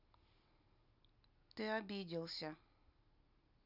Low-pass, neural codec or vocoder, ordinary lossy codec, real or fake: 5.4 kHz; none; none; real